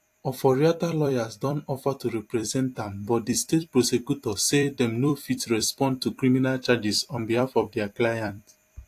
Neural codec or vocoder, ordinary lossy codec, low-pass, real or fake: vocoder, 44.1 kHz, 128 mel bands every 256 samples, BigVGAN v2; AAC, 64 kbps; 14.4 kHz; fake